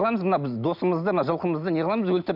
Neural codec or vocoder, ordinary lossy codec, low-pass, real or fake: none; none; 5.4 kHz; real